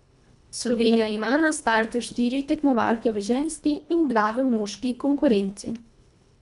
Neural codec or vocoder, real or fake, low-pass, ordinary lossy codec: codec, 24 kHz, 1.5 kbps, HILCodec; fake; 10.8 kHz; none